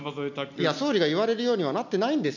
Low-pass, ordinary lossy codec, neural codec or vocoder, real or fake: 7.2 kHz; none; none; real